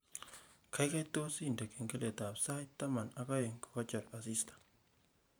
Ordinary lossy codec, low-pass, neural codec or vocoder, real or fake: none; none; vocoder, 44.1 kHz, 128 mel bands every 512 samples, BigVGAN v2; fake